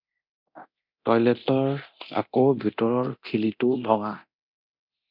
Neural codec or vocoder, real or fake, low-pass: codec, 24 kHz, 0.9 kbps, DualCodec; fake; 5.4 kHz